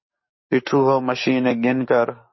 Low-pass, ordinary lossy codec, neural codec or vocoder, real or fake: 7.2 kHz; MP3, 24 kbps; codec, 44.1 kHz, 7.8 kbps, DAC; fake